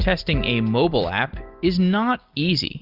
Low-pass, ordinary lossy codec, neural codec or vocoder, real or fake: 5.4 kHz; Opus, 32 kbps; none; real